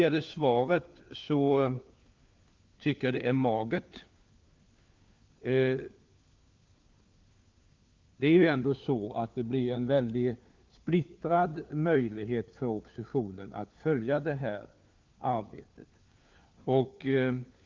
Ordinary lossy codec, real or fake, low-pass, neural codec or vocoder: Opus, 32 kbps; fake; 7.2 kHz; codec, 16 kHz, 4 kbps, FunCodec, trained on LibriTTS, 50 frames a second